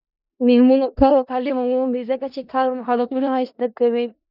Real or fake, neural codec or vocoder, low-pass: fake; codec, 16 kHz in and 24 kHz out, 0.4 kbps, LongCat-Audio-Codec, four codebook decoder; 5.4 kHz